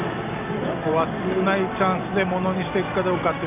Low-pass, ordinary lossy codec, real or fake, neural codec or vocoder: 3.6 kHz; none; real; none